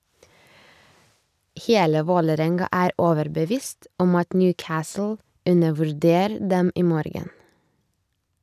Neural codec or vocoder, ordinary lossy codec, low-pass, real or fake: none; none; 14.4 kHz; real